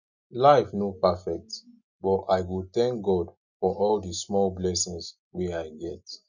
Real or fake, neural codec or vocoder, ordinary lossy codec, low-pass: real; none; none; 7.2 kHz